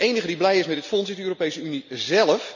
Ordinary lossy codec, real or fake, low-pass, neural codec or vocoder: none; real; 7.2 kHz; none